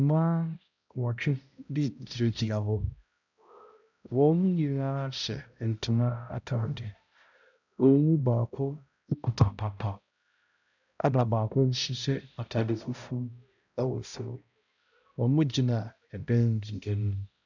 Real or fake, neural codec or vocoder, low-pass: fake; codec, 16 kHz, 0.5 kbps, X-Codec, HuBERT features, trained on balanced general audio; 7.2 kHz